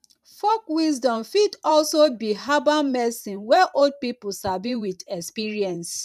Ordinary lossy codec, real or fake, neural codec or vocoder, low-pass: none; fake; vocoder, 44.1 kHz, 128 mel bands every 512 samples, BigVGAN v2; 14.4 kHz